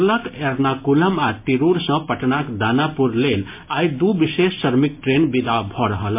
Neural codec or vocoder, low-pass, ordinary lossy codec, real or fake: none; 3.6 kHz; MP3, 24 kbps; real